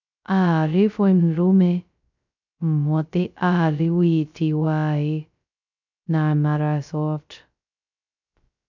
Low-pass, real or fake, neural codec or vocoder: 7.2 kHz; fake; codec, 16 kHz, 0.2 kbps, FocalCodec